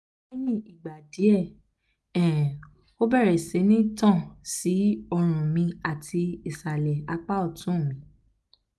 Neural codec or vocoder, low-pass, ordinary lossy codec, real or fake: none; none; none; real